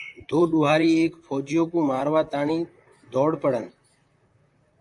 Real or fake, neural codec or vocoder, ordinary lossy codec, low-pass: fake; vocoder, 44.1 kHz, 128 mel bands, Pupu-Vocoder; AAC, 64 kbps; 10.8 kHz